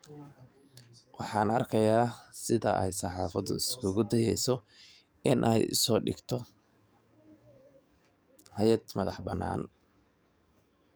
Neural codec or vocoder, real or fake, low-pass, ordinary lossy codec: codec, 44.1 kHz, 7.8 kbps, DAC; fake; none; none